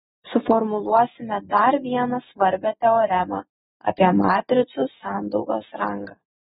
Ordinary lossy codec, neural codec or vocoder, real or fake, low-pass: AAC, 16 kbps; none; real; 7.2 kHz